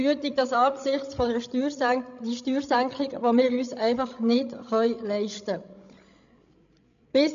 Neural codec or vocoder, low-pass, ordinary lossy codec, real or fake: codec, 16 kHz, 16 kbps, FreqCodec, larger model; 7.2 kHz; MP3, 64 kbps; fake